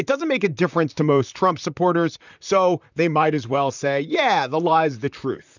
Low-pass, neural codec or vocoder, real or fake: 7.2 kHz; vocoder, 44.1 kHz, 128 mel bands, Pupu-Vocoder; fake